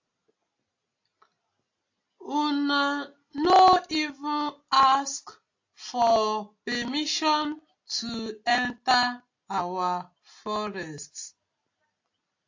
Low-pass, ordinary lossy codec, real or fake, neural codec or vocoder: 7.2 kHz; AAC, 48 kbps; real; none